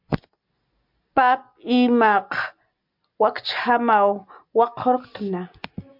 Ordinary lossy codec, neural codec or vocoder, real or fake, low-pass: MP3, 48 kbps; none; real; 5.4 kHz